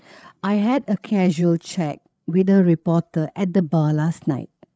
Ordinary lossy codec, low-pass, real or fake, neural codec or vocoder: none; none; fake; codec, 16 kHz, 8 kbps, FreqCodec, larger model